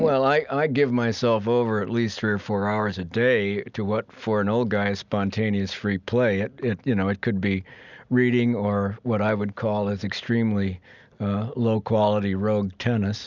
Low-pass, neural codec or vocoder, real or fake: 7.2 kHz; none; real